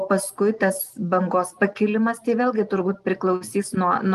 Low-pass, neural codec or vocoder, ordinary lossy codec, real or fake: 14.4 kHz; none; Opus, 32 kbps; real